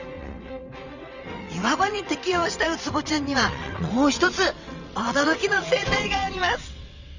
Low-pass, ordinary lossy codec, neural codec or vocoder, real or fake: 7.2 kHz; Opus, 64 kbps; vocoder, 22.05 kHz, 80 mel bands, WaveNeXt; fake